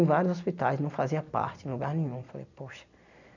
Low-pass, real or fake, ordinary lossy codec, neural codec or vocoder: 7.2 kHz; real; none; none